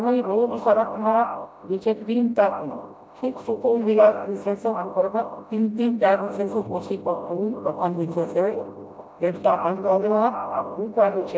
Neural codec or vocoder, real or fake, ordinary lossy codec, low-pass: codec, 16 kHz, 0.5 kbps, FreqCodec, smaller model; fake; none; none